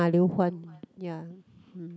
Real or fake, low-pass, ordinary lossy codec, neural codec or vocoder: real; none; none; none